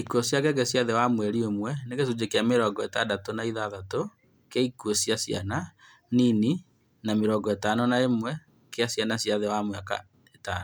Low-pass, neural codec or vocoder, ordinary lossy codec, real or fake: none; none; none; real